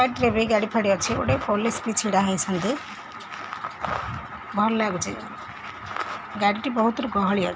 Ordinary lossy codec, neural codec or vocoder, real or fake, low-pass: none; none; real; none